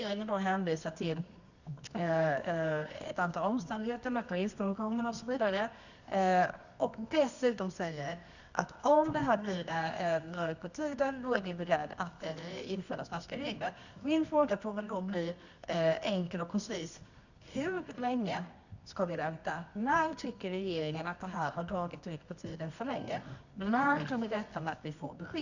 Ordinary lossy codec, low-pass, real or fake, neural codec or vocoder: none; 7.2 kHz; fake; codec, 24 kHz, 0.9 kbps, WavTokenizer, medium music audio release